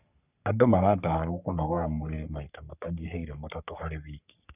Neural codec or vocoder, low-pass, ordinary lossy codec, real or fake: codec, 44.1 kHz, 3.4 kbps, Pupu-Codec; 3.6 kHz; none; fake